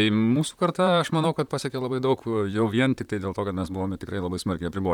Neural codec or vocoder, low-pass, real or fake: vocoder, 44.1 kHz, 128 mel bands, Pupu-Vocoder; 19.8 kHz; fake